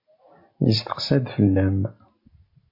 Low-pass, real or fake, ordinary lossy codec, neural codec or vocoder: 5.4 kHz; real; MP3, 32 kbps; none